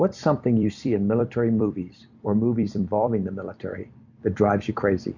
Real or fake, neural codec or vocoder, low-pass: fake; vocoder, 44.1 kHz, 128 mel bands every 256 samples, BigVGAN v2; 7.2 kHz